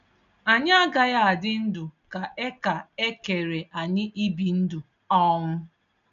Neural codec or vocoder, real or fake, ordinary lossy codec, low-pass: none; real; none; 7.2 kHz